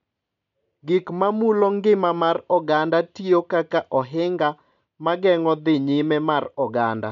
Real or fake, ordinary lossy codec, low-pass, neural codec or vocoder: real; none; 7.2 kHz; none